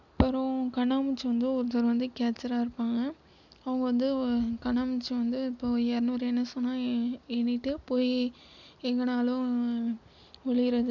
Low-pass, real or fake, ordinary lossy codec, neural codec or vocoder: 7.2 kHz; real; none; none